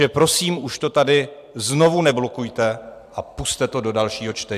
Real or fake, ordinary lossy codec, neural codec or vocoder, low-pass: fake; AAC, 96 kbps; vocoder, 44.1 kHz, 128 mel bands every 256 samples, BigVGAN v2; 14.4 kHz